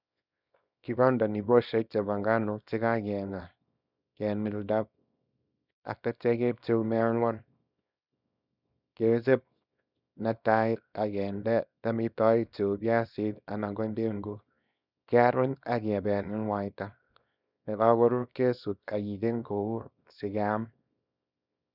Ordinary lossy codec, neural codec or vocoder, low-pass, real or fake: none; codec, 24 kHz, 0.9 kbps, WavTokenizer, small release; 5.4 kHz; fake